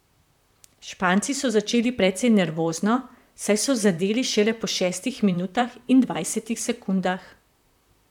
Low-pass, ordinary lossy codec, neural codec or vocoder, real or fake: 19.8 kHz; none; vocoder, 44.1 kHz, 128 mel bands, Pupu-Vocoder; fake